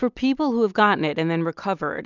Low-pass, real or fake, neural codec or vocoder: 7.2 kHz; real; none